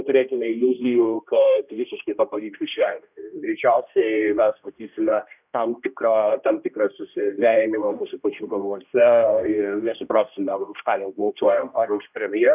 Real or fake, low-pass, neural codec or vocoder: fake; 3.6 kHz; codec, 16 kHz, 1 kbps, X-Codec, HuBERT features, trained on general audio